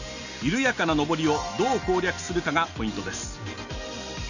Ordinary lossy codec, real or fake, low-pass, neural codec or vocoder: none; real; 7.2 kHz; none